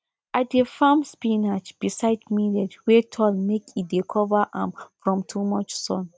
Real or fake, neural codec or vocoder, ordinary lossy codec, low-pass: real; none; none; none